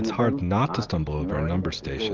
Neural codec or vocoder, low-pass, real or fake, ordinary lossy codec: none; 7.2 kHz; real; Opus, 32 kbps